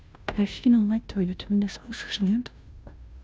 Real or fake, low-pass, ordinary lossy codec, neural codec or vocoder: fake; none; none; codec, 16 kHz, 0.5 kbps, FunCodec, trained on Chinese and English, 25 frames a second